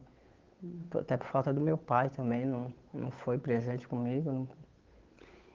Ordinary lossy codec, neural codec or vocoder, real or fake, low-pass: Opus, 32 kbps; codec, 16 kHz, 8 kbps, FunCodec, trained on Chinese and English, 25 frames a second; fake; 7.2 kHz